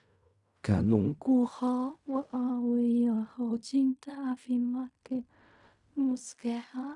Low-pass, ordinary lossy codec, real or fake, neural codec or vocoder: 10.8 kHz; Opus, 64 kbps; fake; codec, 16 kHz in and 24 kHz out, 0.4 kbps, LongCat-Audio-Codec, fine tuned four codebook decoder